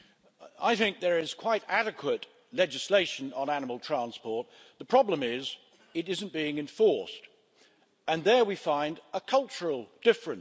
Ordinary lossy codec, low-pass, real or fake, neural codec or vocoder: none; none; real; none